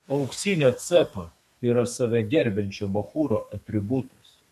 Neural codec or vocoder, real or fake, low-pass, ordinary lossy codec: codec, 32 kHz, 1.9 kbps, SNAC; fake; 14.4 kHz; AAC, 96 kbps